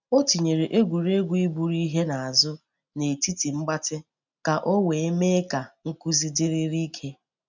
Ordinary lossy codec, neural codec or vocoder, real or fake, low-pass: none; none; real; 7.2 kHz